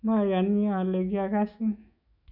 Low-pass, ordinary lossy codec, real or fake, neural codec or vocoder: 5.4 kHz; none; fake; autoencoder, 48 kHz, 128 numbers a frame, DAC-VAE, trained on Japanese speech